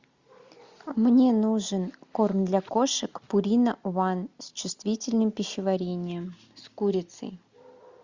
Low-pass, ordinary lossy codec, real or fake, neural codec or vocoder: 7.2 kHz; Opus, 64 kbps; real; none